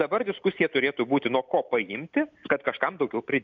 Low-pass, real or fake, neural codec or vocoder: 7.2 kHz; real; none